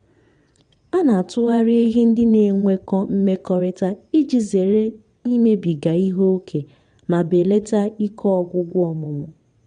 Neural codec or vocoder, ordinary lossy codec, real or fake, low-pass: vocoder, 22.05 kHz, 80 mel bands, WaveNeXt; MP3, 64 kbps; fake; 9.9 kHz